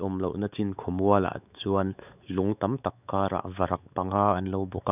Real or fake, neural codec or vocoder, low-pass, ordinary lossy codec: fake; codec, 16 kHz, 4 kbps, X-Codec, WavLM features, trained on Multilingual LibriSpeech; 3.6 kHz; none